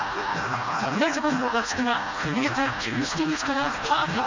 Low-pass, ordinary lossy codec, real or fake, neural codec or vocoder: 7.2 kHz; MP3, 64 kbps; fake; codec, 16 kHz, 1 kbps, FreqCodec, smaller model